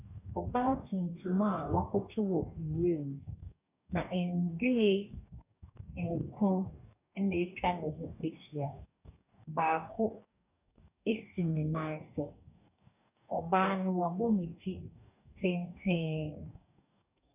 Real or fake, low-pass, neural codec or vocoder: fake; 3.6 kHz; codec, 44.1 kHz, 2.6 kbps, DAC